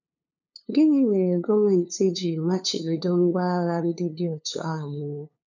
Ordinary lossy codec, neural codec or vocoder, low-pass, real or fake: none; codec, 16 kHz, 2 kbps, FunCodec, trained on LibriTTS, 25 frames a second; 7.2 kHz; fake